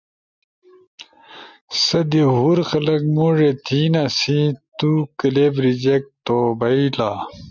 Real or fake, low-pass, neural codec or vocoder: real; 7.2 kHz; none